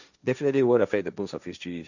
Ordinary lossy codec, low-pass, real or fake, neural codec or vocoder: none; 7.2 kHz; fake; codec, 16 kHz, 1.1 kbps, Voila-Tokenizer